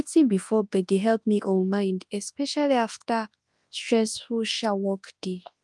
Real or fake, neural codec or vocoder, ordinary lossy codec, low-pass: fake; codec, 24 kHz, 0.9 kbps, WavTokenizer, large speech release; Opus, 32 kbps; 10.8 kHz